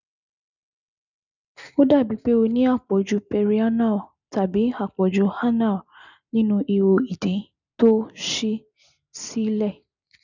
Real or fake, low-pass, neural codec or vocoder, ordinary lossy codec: real; 7.2 kHz; none; none